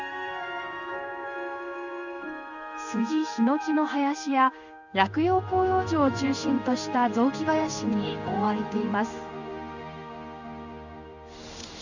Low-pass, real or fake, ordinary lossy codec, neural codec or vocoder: 7.2 kHz; fake; none; codec, 16 kHz in and 24 kHz out, 1 kbps, XY-Tokenizer